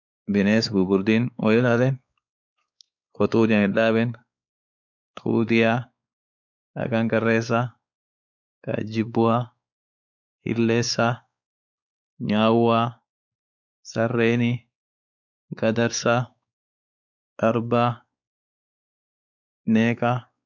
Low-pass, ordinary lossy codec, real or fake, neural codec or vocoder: 7.2 kHz; none; fake; codec, 16 kHz, 4 kbps, X-Codec, WavLM features, trained on Multilingual LibriSpeech